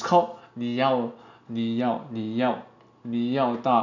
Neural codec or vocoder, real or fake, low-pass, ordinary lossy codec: none; real; 7.2 kHz; none